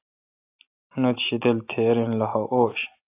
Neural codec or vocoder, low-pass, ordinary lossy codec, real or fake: none; 3.6 kHz; AAC, 24 kbps; real